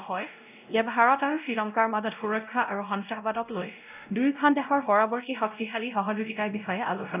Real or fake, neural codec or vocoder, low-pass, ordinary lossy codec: fake; codec, 16 kHz, 0.5 kbps, X-Codec, WavLM features, trained on Multilingual LibriSpeech; 3.6 kHz; none